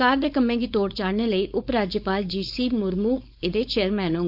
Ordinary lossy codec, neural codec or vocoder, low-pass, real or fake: none; codec, 16 kHz, 4.8 kbps, FACodec; 5.4 kHz; fake